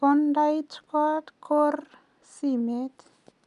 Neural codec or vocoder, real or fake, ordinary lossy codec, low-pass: none; real; MP3, 96 kbps; 10.8 kHz